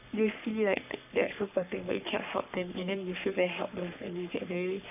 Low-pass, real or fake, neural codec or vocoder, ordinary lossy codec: 3.6 kHz; fake; codec, 44.1 kHz, 3.4 kbps, Pupu-Codec; none